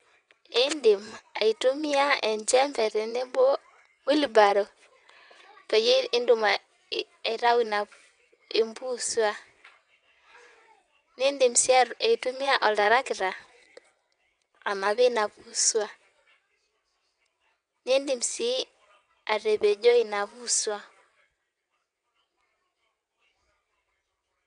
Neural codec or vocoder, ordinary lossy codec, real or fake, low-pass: vocoder, 22.05 kHz, 80 mel bands, WaveNeXt; none; fake; 9.9 kHz